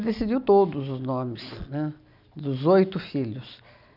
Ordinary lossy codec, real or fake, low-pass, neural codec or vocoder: none; real; 5.4 kHz; none